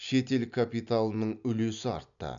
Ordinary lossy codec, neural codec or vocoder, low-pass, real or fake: MP3, 96 kbps; none; 7.2 kHz; real